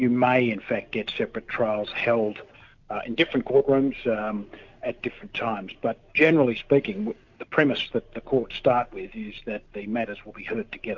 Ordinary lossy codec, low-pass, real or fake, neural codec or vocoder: MP3, 48 kbps; 7.2 kHz; real; none